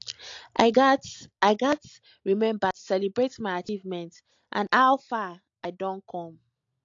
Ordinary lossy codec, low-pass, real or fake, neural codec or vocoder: AAC, 48 kbps; 7.2 kHz; real; none